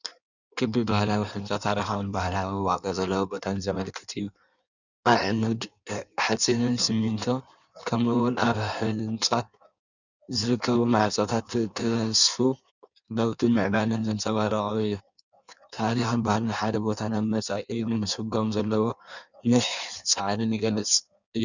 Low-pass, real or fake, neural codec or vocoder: 7.2 kHz; fake; codec, 16 kHz in and 24 kHz out, 1.1 kbps, FireRedTTS-2 codec